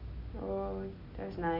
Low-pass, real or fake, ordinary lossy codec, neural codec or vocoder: 5.4 kHz; real; none; none